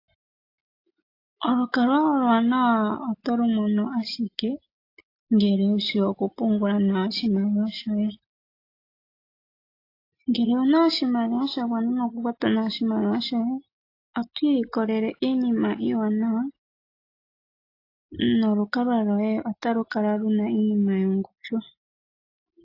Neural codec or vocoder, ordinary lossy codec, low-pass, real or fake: none; AAC, 32 kbps; 5.4 kHz; real